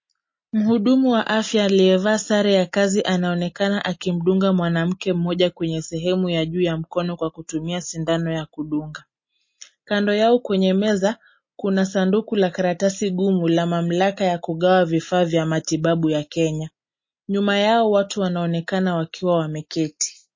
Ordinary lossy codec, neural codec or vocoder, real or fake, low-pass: MP3, 32 kbps; none; real; 7.2 kHz